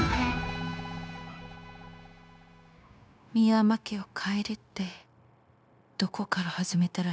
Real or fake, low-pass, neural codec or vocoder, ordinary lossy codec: fake; none; codec, 16 kHz, 0.9 kbps, LongCat-Audio-Codec; none